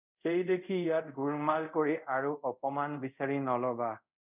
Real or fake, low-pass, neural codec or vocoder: fake; 3.6 kHz; codec, 24 kHz, 0.5 kbps, DualCodec